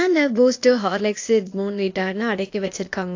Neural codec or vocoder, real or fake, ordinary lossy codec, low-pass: codec, 16 kHz, 0.8 kbps, ZipCodec; fake; AAC, 48 kbps; 7.2 kHz